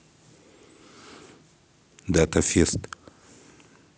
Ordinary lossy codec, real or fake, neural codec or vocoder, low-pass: none; real; none; none